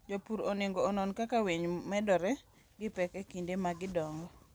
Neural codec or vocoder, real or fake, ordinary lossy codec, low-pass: none; real; none; none